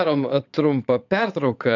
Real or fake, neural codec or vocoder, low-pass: fake; vocoder, 22.05 kHz, 80 mel bands, Vocos; 7.2 kHz